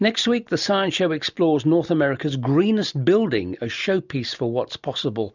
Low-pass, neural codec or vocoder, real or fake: 7.2 kHz; none; real